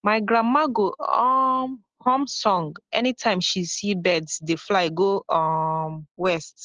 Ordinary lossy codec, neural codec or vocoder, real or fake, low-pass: Opus, 16 kbps; none; real; 7.2 kHz